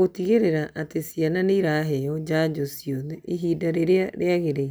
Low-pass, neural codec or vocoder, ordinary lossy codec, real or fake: none; none; none; real